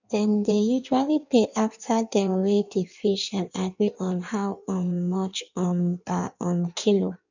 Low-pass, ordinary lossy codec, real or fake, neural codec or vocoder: 7.2 kHz; none; fake; codec, 16 kHz in and 24 kHz out, 1.1 kbps, FireRedTTS-2 codec